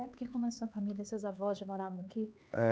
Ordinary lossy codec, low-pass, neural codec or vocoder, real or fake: none; none; codec, 16 kHz, 4 kbps, X-Codec, HuBERT features, trained on LibriSpeech; fake